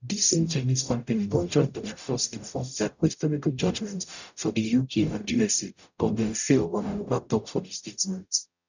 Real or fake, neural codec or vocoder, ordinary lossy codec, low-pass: fake; codec, 44.1 kHz, 0.9 kbps, DAC; none; 7.2 kHz